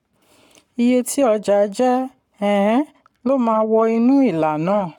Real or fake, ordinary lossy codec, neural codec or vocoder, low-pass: fake; none; codec, 44.1 kHz, 7.8 kbps, Pupu-Codec; 19.8 kHz